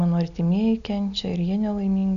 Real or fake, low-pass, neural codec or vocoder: real; 7.2 kHz; none